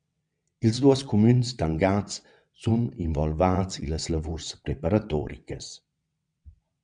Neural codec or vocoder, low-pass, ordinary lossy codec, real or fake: vocoder, 22.05 kHz, 80 mel bands, WaveNeXt; 9.9 kHz; MP3, 96 kbps; fake